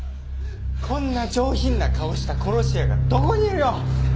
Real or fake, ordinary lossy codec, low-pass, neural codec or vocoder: real; none; none; none